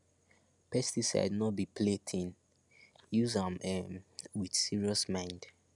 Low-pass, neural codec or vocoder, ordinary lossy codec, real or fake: 10.8 kHz; none; none; real